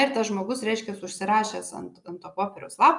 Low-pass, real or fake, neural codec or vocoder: 10.8 kHz; real; none